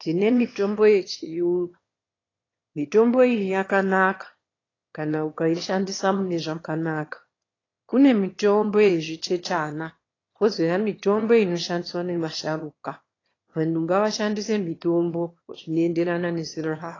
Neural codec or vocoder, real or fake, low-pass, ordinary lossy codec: autoencoder, 22.05 kHz, a latent of 192 numbers a frame, VITS, trained on one speaker; fake; 7.2 kHz; AAC, 32 kbps